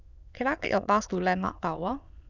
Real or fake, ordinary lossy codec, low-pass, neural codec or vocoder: fake; none; 7.2 kHz; autoencoder, 22.05 kHz, a latent of 192 numbers a frame, VITS, trained on many speakers